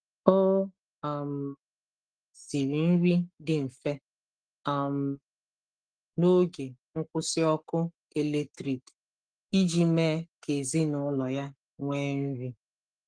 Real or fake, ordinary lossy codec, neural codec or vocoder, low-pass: fake; Opus, 24 kbps; codec, 44.1 kHz, 7.8 kbps, Pupu-Codec; 9.9 kHz